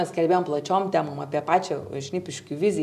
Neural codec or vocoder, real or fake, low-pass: none; real; 14.4 kHz